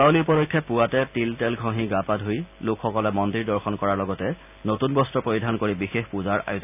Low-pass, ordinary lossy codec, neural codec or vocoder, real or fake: 3.6 kHz; none; none; real